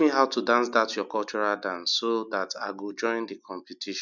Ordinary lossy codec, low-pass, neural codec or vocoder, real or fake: none; 7.2 kHz; none; real